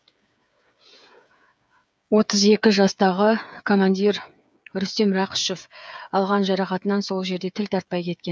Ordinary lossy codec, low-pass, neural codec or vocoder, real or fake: none; none; codec, 16 kHz, 8 kbps, FreqCodec, smaller model; fake